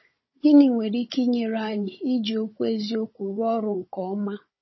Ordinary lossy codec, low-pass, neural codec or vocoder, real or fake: MP3, 24 kbps; 7.2 kHz; codec, 16 kHz, 16 kbps, FunCodec, trained on Chinese and English, 50 frames a second; fake